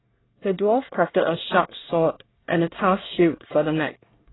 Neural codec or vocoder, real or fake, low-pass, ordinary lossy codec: codec, 24 kHz, 1 kbps, SNAC; fake; 7.2 kHz; AAC, 16 kbps